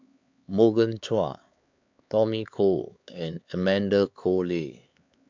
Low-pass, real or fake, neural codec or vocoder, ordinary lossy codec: 7.2 kHz; fake; codec, 16 kHz, 4 kbps, X-Codec, HuBERT features, trained on LibriSpeech; MP3, 64 kbps